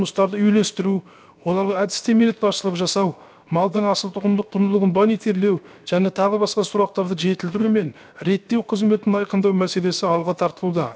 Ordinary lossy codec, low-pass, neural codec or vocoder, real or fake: none; none; codec, 16 kHz, 0.7 kbps, FocalCodec; fake